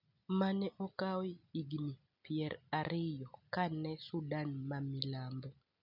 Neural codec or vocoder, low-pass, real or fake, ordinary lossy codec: none; 5.4 kHz; real; none